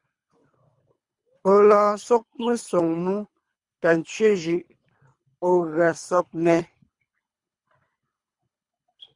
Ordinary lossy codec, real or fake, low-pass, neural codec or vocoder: Opus, 64 kbps; fake; 10.8 kHz; codec, 24 kHz, 3 kbps, HILCodec